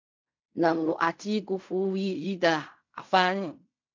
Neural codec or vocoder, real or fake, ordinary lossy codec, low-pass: codec, 16 kHz in and 24 kHz out, 0.4 kbps, LongCat-Audio-Codec, fine tuned four codebook decoder; fake; MP3, 64 kbps; 7.2 kHz